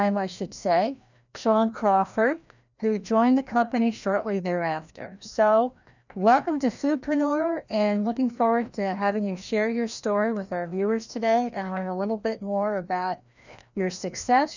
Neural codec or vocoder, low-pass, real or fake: codec, 16 kHz, 1 kbps, FreqCodec, larger model; 7.2 kHz; fake